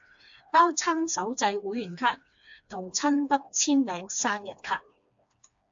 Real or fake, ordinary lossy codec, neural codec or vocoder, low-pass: fake; AAC, 64 kbps; codec, 16 kHz, 2 kbps, FreqCodec, smaller model; 7.2 kHz